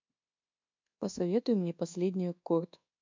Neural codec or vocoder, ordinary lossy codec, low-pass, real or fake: codec, 24 kHz, 1.2 kbps, DualCodec; MP3, 64 kbps; 7.2 kHz; fake